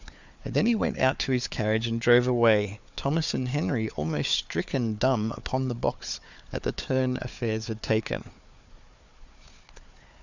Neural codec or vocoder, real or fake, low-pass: codec, 16 kHz, 4 kbps, FunCodec, trained on Chinese and English, 50 frames a second; fake; 7.2 kHz